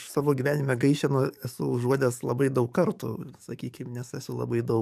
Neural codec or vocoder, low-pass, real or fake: codec, 44.1 kHz, 7.8 kbps, DAC; 14.4 kHz; fake